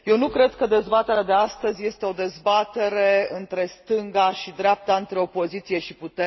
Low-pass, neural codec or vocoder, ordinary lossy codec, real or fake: 7.2 kHz; none; MP3, 24 kbps; real